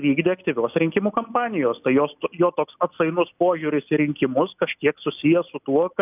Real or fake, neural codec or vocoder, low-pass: real; none; 3.6 kHz